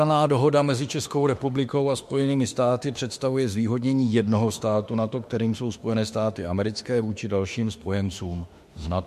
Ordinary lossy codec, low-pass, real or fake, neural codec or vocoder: MP3, 64 kbps; 14.4 kHz; fake; autoencoder, 48 kHz, 32 numbers a frame, DAC-VAE, trained on Japanese speech